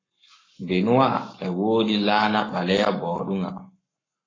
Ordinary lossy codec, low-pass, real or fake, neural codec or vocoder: AAC, 32 kbps; 7.2 kHz; fake; codec, 44.1 kHz, 7.8 kbps, Pupu-Codec